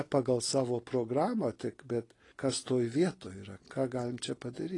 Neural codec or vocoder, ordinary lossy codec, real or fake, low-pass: none; AAC, 32 kbps; real; 10.8 kHz